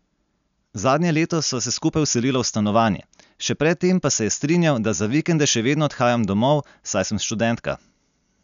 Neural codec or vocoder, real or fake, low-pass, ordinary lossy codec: none; real; 7.2 kHz; none